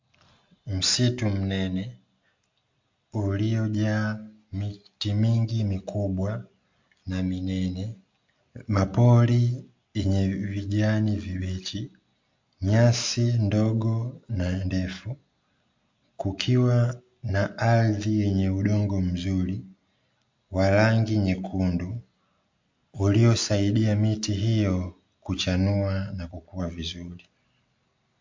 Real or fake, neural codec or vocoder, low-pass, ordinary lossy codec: real; none; 7.2 kHz; MP3, 48 kbps